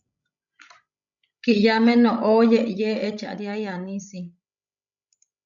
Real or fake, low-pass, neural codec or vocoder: fake; 7.2 kHz; codec, 16 kHz, 16 kbps, FreqCodec, larger model